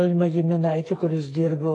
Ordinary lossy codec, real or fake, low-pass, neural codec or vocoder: AAC, 48 kbps; fake; 10.8 kHz; codec, 44.1 kHz, 2.6 kbps, SNAC